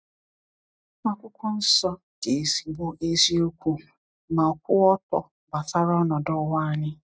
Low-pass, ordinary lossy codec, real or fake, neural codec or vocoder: none; none; real; none